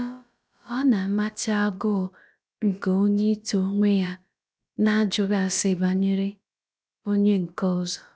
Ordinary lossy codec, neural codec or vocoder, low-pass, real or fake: none; codec, 16 kHz, about 1 kbps, DyCAST, with the encoder's durations; none; fake